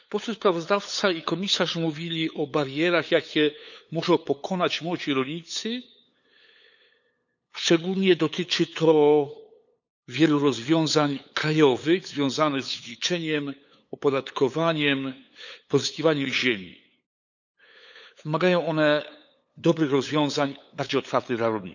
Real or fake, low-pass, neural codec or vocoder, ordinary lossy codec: fake; 7.2 kHz; codec, 16 kHz, 8 kbps, FunCodec, trained on LibriTTS, 25 frames a second; none